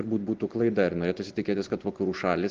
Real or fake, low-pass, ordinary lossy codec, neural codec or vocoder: real; 7.2 kHz; Opus, 16 kbps; none